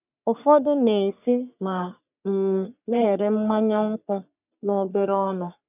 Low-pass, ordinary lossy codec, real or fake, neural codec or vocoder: 3.6 kHz; none; fake; codec, 44.1 kHz, 3.4 kbps, Pupu-Codec